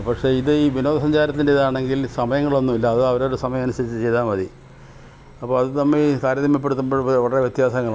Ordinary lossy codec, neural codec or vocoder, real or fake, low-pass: none; none; real; none